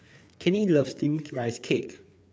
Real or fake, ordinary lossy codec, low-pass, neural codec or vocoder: fake; none; none; codec, 16 kHz, 4 kbps, FreqCodec, larger model